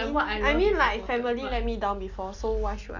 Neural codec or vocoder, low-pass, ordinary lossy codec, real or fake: none; 7.2 kHz; Opus, 64 kbps; real